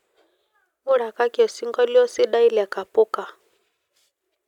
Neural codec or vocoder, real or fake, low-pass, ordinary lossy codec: none; real; 19.8 kHz; none